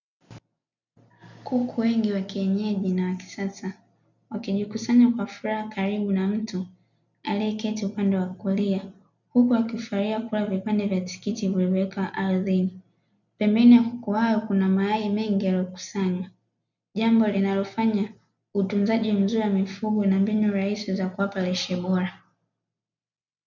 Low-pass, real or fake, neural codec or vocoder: 7.2 kHz; real; none